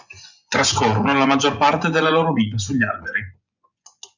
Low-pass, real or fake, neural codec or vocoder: 7.2 kHz; fake; vocoder, 24 kHz, 100 mel bands, Vocos